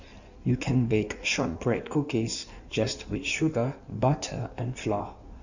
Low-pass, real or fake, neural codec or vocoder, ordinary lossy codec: 7.2 kHz; fake; codec, 16 kHz in and 24 kHz out, 1.1 kbps, FireRedTTS-2 codec; none